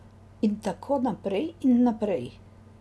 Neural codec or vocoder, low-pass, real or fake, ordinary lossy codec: none; none; real; none